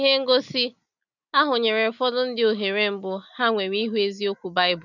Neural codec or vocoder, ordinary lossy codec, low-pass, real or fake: none; none; 7.2 kHz; real